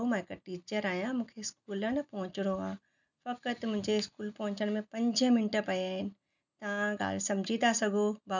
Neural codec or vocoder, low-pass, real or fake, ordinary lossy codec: none; 7.2 kHz; real; none